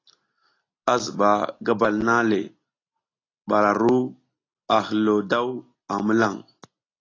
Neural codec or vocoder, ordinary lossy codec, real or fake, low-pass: none; AAC, 32 kbps; real; 7.2 kHz